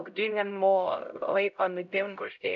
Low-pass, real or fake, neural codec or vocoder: 7.2 kHz; fake; codec, 16 kHz, 0.5 kbps, X-Codec, HuBERT features, trained on LibriSpeech